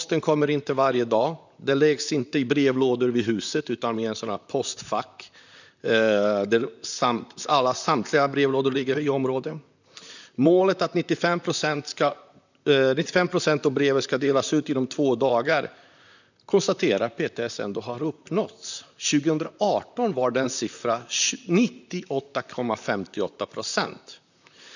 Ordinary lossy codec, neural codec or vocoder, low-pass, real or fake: none; vocoder, 44.1 kHz, 128 mel bands, Pupu-Vocoder; 7.2 kHz; fake